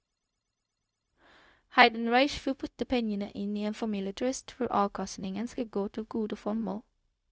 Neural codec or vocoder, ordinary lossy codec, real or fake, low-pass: codec, 16 kHz, 0.4 kbps, LongCat-Audio-Codec; none; fake; none